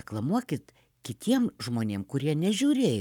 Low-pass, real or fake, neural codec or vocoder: 19.8 kHz; fake; codec, 44.1 kHz, 7.8 kbps, Pupu-Codec